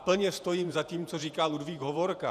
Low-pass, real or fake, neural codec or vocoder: 14.4 kHz; fake; vocoder, 48 kHz, 128 mel bands, Vocos